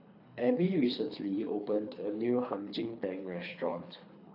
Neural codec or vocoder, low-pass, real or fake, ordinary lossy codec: codec, 24 kHz, 3 kbps, HILCodec; 5.4 kHz; fake; AAC, 32 kbps